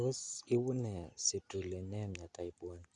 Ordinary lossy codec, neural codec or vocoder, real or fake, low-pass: Opus, 64 kbps; none; real; 9.9 kHz